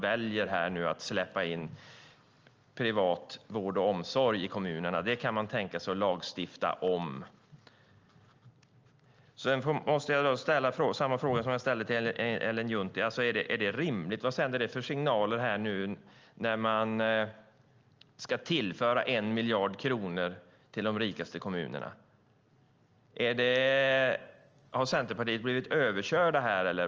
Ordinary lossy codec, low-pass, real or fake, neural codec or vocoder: Opus, 24 kbps; 7.2 kHz; real; none